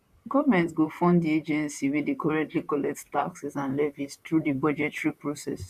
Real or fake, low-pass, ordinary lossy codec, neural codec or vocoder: fake; 14.4 kHz; none; vocoder, 44.1 kHz, 128 mel bands, Pupu-Vocoder